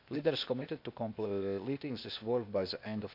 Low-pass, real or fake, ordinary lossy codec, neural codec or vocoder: 5.4 kHz; fake; none; codec, 16 kHz, 0.8 kbps, ZipCodec